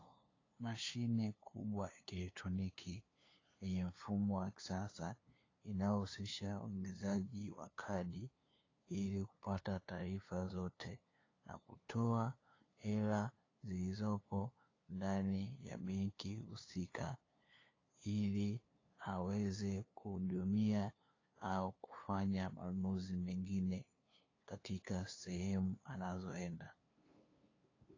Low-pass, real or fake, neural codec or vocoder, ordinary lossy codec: 7.2 kHz; fake; codec, 16 kHz, 2 kbps, FunCodec, trained on LibriTTS, 25 frames a second; AAC, 32 kbps